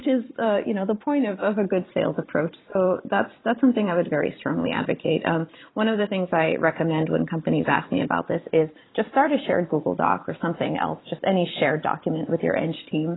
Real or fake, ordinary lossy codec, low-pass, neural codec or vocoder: fake; AAC, 16 kbps; 7.2 kHz; vocoder, 44.1 kHz, 128 mel bands every 512 samples, BigVGAN v2